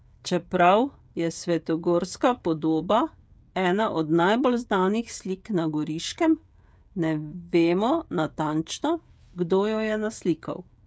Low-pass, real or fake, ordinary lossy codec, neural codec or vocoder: none; fake; none; codec, 16 kHz, 16 kbps, FreqCodec, smaller model